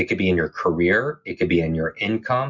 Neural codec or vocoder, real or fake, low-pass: none; real; 7.2 kHz